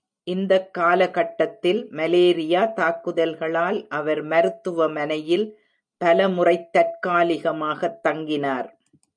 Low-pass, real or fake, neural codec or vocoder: 9.9 kHz; real; none